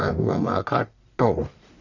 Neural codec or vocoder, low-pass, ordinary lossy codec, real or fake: codec, 44.1 kHz, 1.7 kbps, Pupu-Codec; 7.2 kHz; none; fake